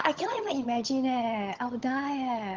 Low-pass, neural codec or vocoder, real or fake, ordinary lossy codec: 7.2 kHz; vocoder, 22.05 kHz, 80 mel bands, HiFi-GAN; fake; Opus, 16 kbps